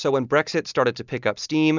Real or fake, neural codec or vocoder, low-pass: real; none; 7.2 kHz